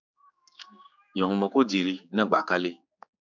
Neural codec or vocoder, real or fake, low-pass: codec, 16 kHz, 4 kbps, X-Codec, HuBERT features, trained on general audio; fake; 7.2 kHz